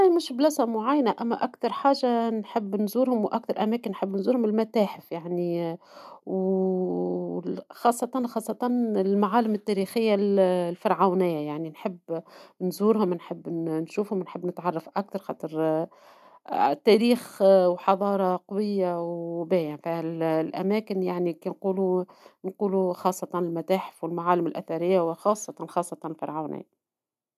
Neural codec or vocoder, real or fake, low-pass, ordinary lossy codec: none; real; 14.4 kHz; none